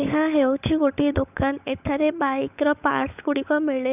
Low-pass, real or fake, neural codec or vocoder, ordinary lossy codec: 3.6 kHz; fake; codec, 16 kHz, 16 kbps, FunCodec, trained on LibriTTS, 50 frames a second; none